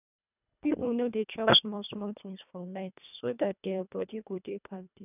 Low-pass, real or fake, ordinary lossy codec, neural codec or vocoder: 3.6 kHz; fake; none; codec, 24 kHz, 1.5 kbps, HILCodec